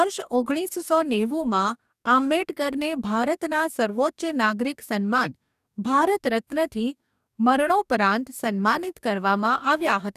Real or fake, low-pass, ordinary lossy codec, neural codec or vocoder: fake; 14.4 kHz; none; codec, 44.1 kHz, 2.6 kbps, DAC